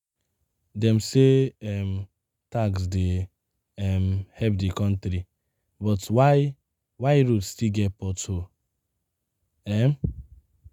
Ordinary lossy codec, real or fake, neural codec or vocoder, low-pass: none; real; none; 19.8 kHz